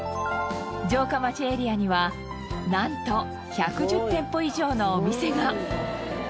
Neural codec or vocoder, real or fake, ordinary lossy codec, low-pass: none; real; none; none